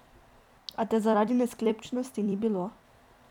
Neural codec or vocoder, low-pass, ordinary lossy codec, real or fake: vocoder, 44.1 kHz, 128 mel bands every 256 samples, BigVGAN v2; 19.8 kHz; none; fake